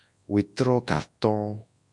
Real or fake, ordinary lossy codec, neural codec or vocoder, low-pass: fake; MP3, 64 kbps; codec, 24 kHz, 0.9 kbps, WavTokenizer, large speech release; 10.8 kHz